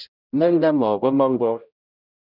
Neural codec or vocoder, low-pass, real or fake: codec, 16 kHz, 0.5 kbps, X-Codec, HuBERT features, trained on general audio; 5.4 kHz; fake